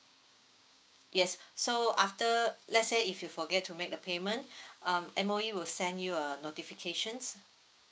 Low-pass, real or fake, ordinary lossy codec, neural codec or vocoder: none; fake; none; codec, 16 kHz, 6 kbps, DAC